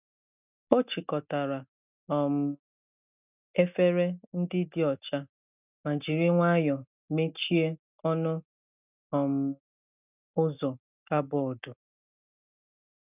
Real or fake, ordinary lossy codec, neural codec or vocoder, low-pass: real; none; none; 3.6 kHz